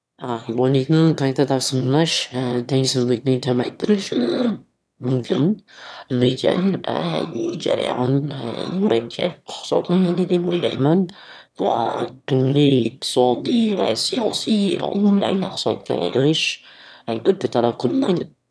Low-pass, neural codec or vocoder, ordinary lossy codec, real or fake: none; autoencoder, 22.05 kHz, a latent of 192 numbers a frame, VITS, trained on one speaker; none; fake